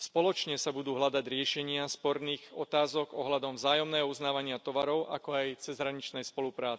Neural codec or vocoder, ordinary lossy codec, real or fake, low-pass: none; none; real; none